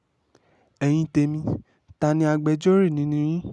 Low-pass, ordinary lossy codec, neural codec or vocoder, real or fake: none; none; none; real